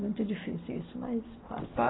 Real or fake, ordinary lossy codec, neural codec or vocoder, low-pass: real; AAC, 16 kbps; none; 7.2 kHz